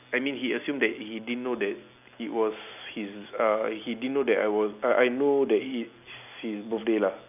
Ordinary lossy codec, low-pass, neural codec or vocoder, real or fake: none; 3.6 kHz; none; real